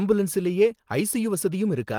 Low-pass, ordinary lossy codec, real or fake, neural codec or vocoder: 19.8 kHz; Opus, 24 kbps; real; none